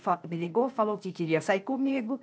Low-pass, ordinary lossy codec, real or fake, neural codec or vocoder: none; none; fake; codec, 16 kHz, 0.8 kbps, ZipCodec